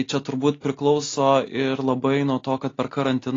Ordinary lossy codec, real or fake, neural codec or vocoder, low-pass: AAC, 32 kbps; real; none; 7.2 kHz